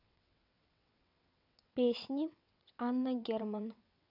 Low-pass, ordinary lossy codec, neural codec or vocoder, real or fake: 5.4 kHz; none; vocoder, 22.05 kHz, 80 mel bands, WaveNeXt; fake